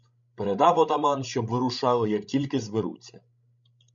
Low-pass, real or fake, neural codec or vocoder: 7.2 kHz; fake; codec, 16 kHz, 16 kbps, FreqCodec, larger model